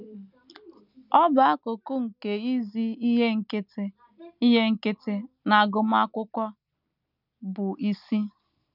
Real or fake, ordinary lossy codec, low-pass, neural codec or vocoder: real; none; 5.4 kHz; none